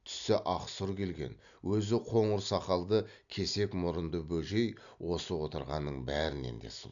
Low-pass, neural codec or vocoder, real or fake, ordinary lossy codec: 7.2 kHz; none; real; MP3, 96 kbps